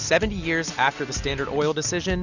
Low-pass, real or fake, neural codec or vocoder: 7.2 kHz; real; none